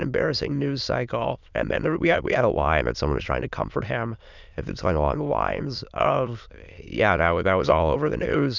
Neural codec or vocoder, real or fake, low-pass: autoencoder, 22.05 kHz, a latent of 192 numbers a frame, VITS, trained on many speakers; fake; 7.2 kHz